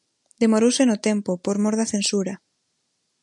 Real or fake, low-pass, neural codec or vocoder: real; 10.8 kHz; none